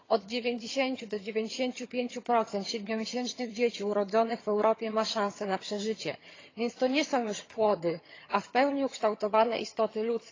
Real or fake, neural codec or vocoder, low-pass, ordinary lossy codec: fake; vocoder, 22.05 kHz, 80 mel bands, HiFi-GAN; 7.2 kHz; AAC, 32 kbps